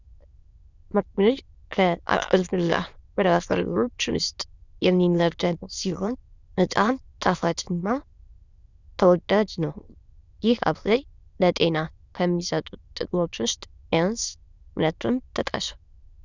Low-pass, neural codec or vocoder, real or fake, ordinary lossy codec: 7.2 kHz; autoencoder, 22.05 kHz, a latent of 192 numbers a frame, VITS, trained on many speakers; fake; Opus, 64 kbps